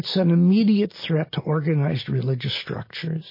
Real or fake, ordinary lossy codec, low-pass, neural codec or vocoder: real; MP3, 24 kbps; 5.4 kHz; none